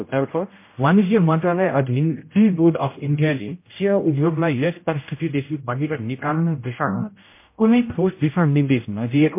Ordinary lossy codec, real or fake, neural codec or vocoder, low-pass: MP3, 24 kbps; fake; codec, 16 kHz, 0.5 kbps, X-Codec, HuBERT features, trained on general audio; 3.6 kHz